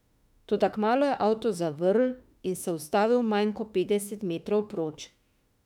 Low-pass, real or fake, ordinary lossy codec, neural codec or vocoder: 19.8 kHz; fake; none; autoencoder, 48 kHz, 32 numbers a frame, DAC-VAE, trained on Japanese speech